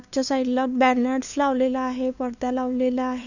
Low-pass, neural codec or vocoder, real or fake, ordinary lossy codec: 7.2 kHz; codec, 16 kHz, 2 kbps, FunCodec, trained on LibriTTS, 25 frames a second; fake; none